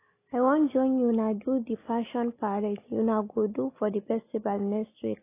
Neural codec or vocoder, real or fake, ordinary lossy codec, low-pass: none; real; AAC, 24 kbps; 3.6 kHz